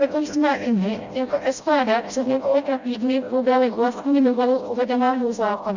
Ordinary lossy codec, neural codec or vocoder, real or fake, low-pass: Opus, 64 kbps; codec, 16 kHz, 0.5 kbps, FreqCodec, smaller model; fake; 7.2 kHz